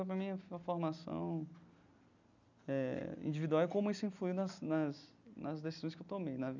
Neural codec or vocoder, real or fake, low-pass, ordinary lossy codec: none; real; 7.2 kHz; none